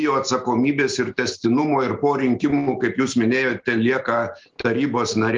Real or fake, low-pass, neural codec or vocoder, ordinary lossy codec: real; 7.2 kHz; none; Opus, 32 kbps